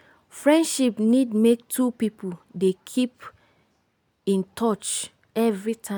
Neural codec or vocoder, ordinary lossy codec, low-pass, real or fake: none; none; none; real